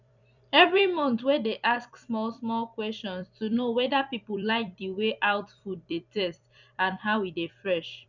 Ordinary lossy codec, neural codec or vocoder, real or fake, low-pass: none; vocoder, 44.1 kHz, 128 mel bands every 256 samples, BigVGAN v2; fake; 7.2 kHz